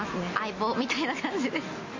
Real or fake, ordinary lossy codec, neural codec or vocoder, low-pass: real; MP3, 32 kbps; none; 7.2 kHz